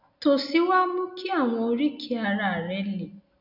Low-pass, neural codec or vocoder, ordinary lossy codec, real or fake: 5.4 kHz; none; none; real